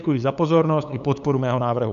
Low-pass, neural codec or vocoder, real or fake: 7.2 kHz; codec, 16 kHz, 8 kbps, FunCodec, trained on LibriTTS, 25 frames a second; fake